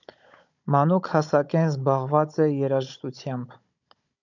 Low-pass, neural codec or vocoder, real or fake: 7.2 kHz; codec, 16 kHz, 16 kbps, FunCodec, trained on Chinese and English, 50 frames a second; fake